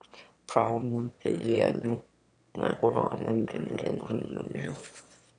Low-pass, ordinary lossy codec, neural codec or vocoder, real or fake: 9.9 kHz; none; autoencoder, 22.05 kHz, a latent of 192 numbers a frame, VITS, trained on one speaker; fake